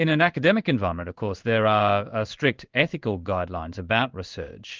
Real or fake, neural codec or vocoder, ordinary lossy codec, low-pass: fake; codec, 16 kHz in and 24 kHz out, 1 kbps, XY-Tokenizer; Opus, 32 kbps; 7.2 kHz